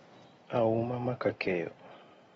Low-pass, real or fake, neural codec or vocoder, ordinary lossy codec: 19.8 kHz; real; none; AAC, 24 kbps